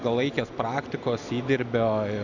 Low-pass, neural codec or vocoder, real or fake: 7.2 kHz; none; real